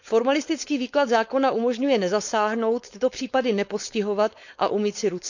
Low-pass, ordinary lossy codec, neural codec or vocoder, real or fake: 7.2 kHz; none; codec, 16 kHz, 4.8 kbps, FACodec; fake